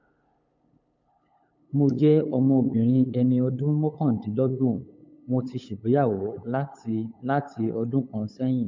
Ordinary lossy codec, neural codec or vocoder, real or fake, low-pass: MP3, 48 kbps; codec, 16 kHz, 8 kbps, FunCodec, trained on LibriTTS, 25 frames a second; fake; 7.2 kHz